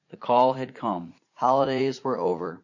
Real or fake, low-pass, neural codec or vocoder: fake; 7.2 kHz; vocoder, 44.1 kHz, 80 mel bands, Vocos